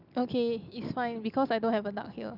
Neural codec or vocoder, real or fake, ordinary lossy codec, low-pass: none; real; none; 5.4 kHz